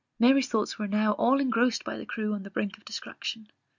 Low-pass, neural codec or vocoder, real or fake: 7.2 kHz; none; real